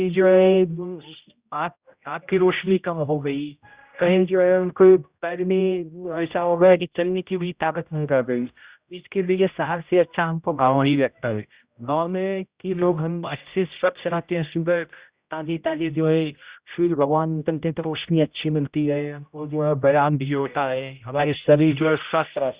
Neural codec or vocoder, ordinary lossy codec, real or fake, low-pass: codec, 16 kHz, 0.5 kbps, X-Codec, HuBERT features, trained on general audio; Opus, 64 kbps; fake; 3.6 kHz